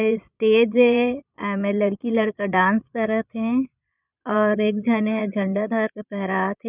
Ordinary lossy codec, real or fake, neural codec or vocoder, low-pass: none; fake; vocoder, 22.05 kHz, 80 mel bands, Vocos; 3.6 kHz